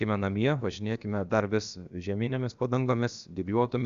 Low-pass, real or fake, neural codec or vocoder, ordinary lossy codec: 7.2 kHz; fake; codec, 16 kHz, about 1 kbps, DyCAST, with the encoder's durations; Opus, 64 kbps